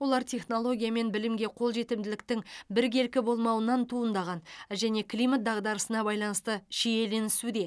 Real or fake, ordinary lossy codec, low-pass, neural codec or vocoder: real; none; none; none